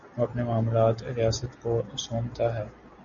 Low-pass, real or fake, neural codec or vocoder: 7.2 kHz; real; none